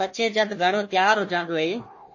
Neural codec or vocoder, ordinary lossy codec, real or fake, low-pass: codec, 16 kHz, 1 kbps, FunCodec, trained on Chinese and English, 50 frames a second; MP3, 32 kbps; fake; 7.2 kHz